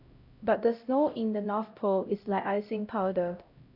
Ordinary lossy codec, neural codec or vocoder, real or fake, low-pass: none; codec, 16 kHz, 0.5 kbps, X-Codec, HuBERT features, trained on LibriSpeech; fake; 5.4 kHz